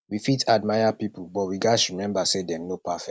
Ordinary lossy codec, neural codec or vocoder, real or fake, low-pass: none; none; real; none